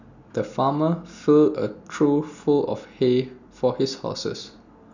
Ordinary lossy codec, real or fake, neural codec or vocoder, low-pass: none; real; none; 7.2 kHz